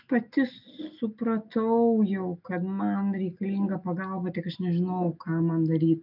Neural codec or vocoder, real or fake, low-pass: none; real; 5.4 kHz